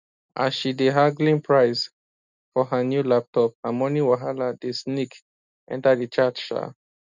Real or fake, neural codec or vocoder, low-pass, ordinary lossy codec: real; none; 7.2 kHz; none